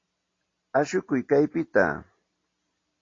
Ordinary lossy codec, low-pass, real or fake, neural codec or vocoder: AAC, 32 kbps; 7.2 kHz; real; none